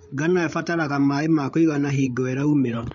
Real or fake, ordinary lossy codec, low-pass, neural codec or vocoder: fake; MP3, 48 kbps; 7.2 kHz; codec, 16 kHz, 8 kbps, FreqCodec, larger model